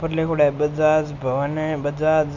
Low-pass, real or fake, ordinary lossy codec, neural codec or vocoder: 7.2 kHz; real; none; none